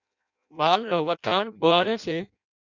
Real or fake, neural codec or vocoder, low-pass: fake; codec, 16 kHz in and 24 kHz out, 0.6 kbps, FireRedTTS-2 codec; 7.2 kHz